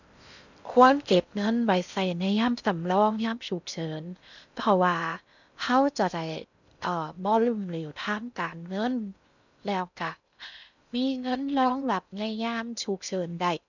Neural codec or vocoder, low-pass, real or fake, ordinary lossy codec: codec, 16 kHz in and 24 kHz out, 0.6 kbps, FocalCodec, streaming, 4096 codes; 7.2 kHz; fake; none